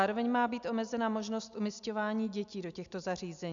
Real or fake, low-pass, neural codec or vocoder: real; 7.2 kHz; none